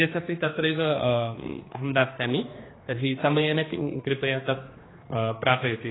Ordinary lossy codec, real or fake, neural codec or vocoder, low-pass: AAC, 16 kbps; fake; codec, 16 kHz, 2 kbps, X-Codec, HuBERT features, trained on general audio; 7.2 kHz